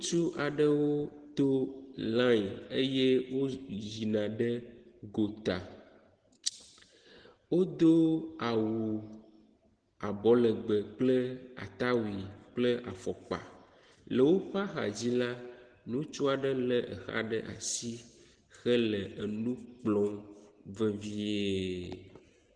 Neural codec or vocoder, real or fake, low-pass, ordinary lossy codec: none; real; 9.9 kHz; Opus, 16 kbps